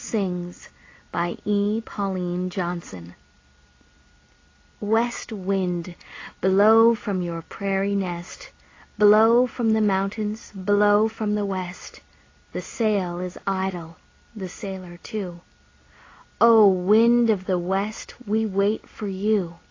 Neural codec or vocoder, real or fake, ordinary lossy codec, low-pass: none; real; AAC, 32 kbps; 7.2 kHz